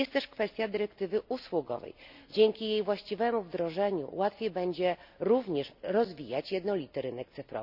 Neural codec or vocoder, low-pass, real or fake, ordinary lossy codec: none; 5.4 kHz; real; none